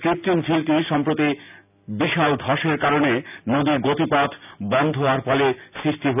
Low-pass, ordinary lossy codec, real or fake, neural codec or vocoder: 3.6 kHz; none; real; none